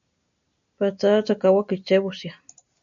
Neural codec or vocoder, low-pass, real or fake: none; 7.2 kHz; real